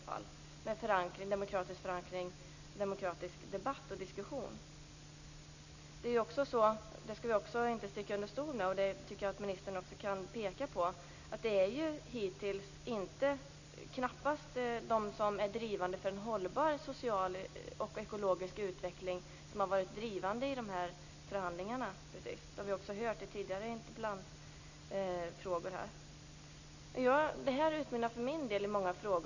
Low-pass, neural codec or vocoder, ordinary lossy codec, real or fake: 7.2 kHz; none; none; real